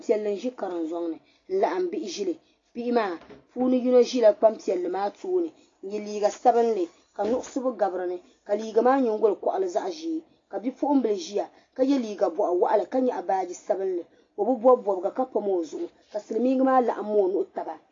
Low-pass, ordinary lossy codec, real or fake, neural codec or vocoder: 7.2 kHz; AAC, 32 kbps; real; none